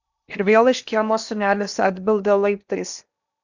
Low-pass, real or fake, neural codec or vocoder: 7.2 kHz; fake; codec, 16 kHz in and 24 kHz out, 0.8 kbps, FocalCodec, streaming, 65536 codes